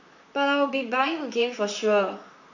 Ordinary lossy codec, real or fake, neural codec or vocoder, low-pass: none; fake; vocoder, 44.1 kHz, 128 mel bands, Pupu-Vocoder; 7.2 kHz